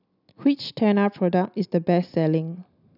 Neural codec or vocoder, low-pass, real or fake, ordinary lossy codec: none; 5.4 kHz; real; none